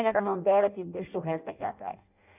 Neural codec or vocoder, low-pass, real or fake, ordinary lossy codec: codec, 16 kHz in and 24 kHz out, 0.6 kbps, FireRedTTS-2 codec; 3.6 kHz; fake; none